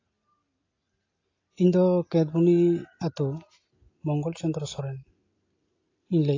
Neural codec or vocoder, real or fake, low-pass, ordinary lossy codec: none; real; 7.2 kHz; AAC, 32 kbps